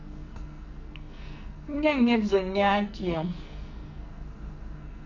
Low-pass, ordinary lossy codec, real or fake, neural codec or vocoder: 7.2 kHz; none; fake; codec, 44.1 kHz, 2.6 kbps, SNAC